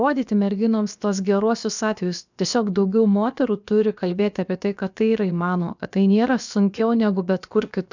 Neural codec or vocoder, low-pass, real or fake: codec, 16 kHz, about 1 kbps, DyCAST, with the encoder's durations; 7.2 kHz; fake